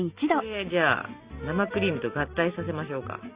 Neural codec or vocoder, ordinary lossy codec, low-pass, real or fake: none; Opus, 64 kbps; 3.6 kHz; real